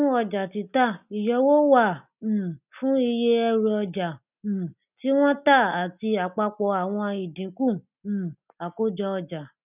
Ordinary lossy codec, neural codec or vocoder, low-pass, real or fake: none; none; 3.6 kHz; real